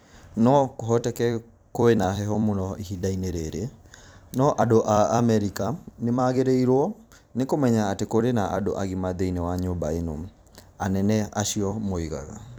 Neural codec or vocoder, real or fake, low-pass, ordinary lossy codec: vocoder, 44.1 kHz, 128 mel bands every 256 samples, BigVGAN v2; fake; none; none